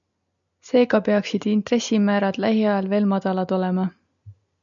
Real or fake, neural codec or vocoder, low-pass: real; none; 7.2 kHz